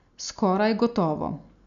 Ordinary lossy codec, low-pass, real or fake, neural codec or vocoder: none; 7.2 kHz; real; none